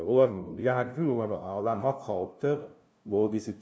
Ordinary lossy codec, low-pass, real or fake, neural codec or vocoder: none; none; fake; codec, 16 kHz, 0.5 kbps, FunCodec, trained on LibriTTS, 25 frames a second